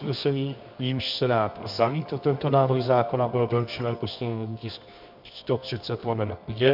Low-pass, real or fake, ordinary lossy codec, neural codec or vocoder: 5.4 kHz; fake; MP3, 48 kbps; codec, 24 kHz, 0.9 kbps, WavTokenizer, medium music audio release